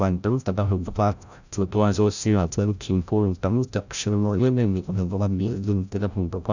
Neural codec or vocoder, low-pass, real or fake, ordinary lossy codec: codec, 16 kHz, 0.5 kbps, FreqCodec, larger model; 7.2 kHz; fake; none